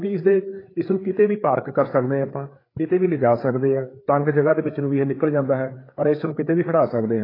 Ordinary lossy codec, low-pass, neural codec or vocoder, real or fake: AAC, 24 kbps; 5.4 kHz; codec, 16 kHz, 4 kbps, FreqCodec, larger model; fake